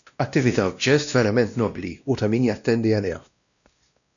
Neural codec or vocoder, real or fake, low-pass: codec, 16 kHz, 1 kbps, X-Codec, WavLM features, trained on Multilingual LibriSpeech; fake; 7.2 kHz